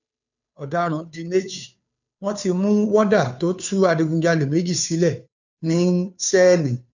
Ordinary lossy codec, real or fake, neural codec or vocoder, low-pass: none; fake; codec, 16 kHz, 2 kbps, FunCodec, trained on Chinese and English, 25 frames a second; 7.2 kHz